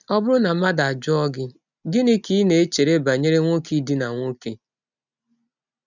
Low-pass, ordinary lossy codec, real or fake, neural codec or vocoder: 7.2 kHz; none; real; none